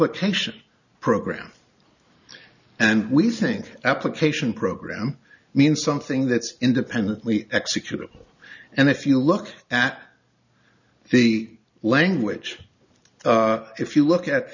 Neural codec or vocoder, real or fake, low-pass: none; real; 7.2 kHz